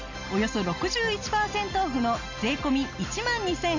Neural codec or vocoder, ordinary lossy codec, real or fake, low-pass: none; none; real; 7.2 kHz